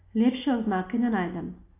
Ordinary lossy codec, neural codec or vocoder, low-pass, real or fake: AAC, 24 kbps; none; 3.6 kHz; real